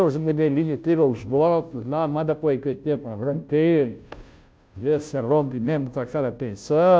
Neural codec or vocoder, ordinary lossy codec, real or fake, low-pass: codec, 16 kHz, 0.5 kbps, FunCodec, trained on Chinese and English, 25 frames a second; none; fake; none